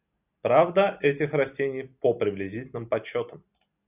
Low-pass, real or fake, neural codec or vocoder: 3.6 kHz; real; none